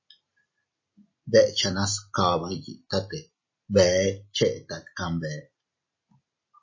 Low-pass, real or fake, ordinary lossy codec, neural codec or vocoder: 7.2 kHz; real; MP3, 32 kbps; none